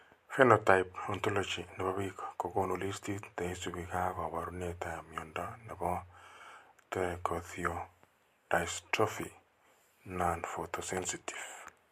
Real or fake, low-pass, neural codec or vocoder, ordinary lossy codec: real; 14.4 kHz; none; MP3, 64 kbps